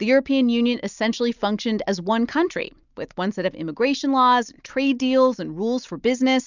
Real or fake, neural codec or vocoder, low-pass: real; none; 7.2 kHz